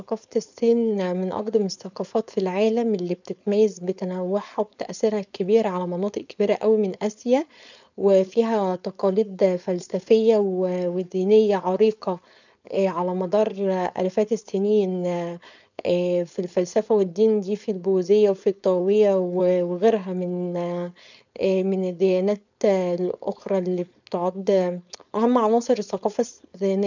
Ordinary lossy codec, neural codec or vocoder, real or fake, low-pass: none; codec, 16 kHz, 4.8 kbps, FACodec; fake; 7.2 kHz